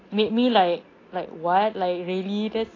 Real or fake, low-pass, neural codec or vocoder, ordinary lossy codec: real; 7.2 kHz; none; AAC, 32 kbps